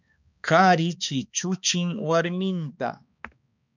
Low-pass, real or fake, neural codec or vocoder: 7.2 kHz; fake; codec, 16 kHz, 2 kbps, X-Codec, HuBERT features, trained on balanced general audio